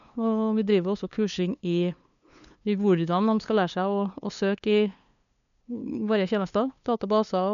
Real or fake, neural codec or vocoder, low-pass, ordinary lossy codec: fake; codec, 16 kHz, 2 kbps, FunCodec, trained on LibriTTS, 25 frames a second; 7.2 kHz; none